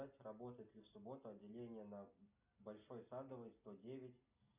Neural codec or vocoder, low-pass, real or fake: none; 3.6 kHz; real